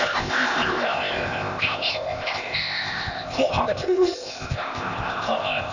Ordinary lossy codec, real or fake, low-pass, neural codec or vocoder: none; fake; 7.2 kHz; codec, 16 kHz, 0.8 kbps, ZipCodec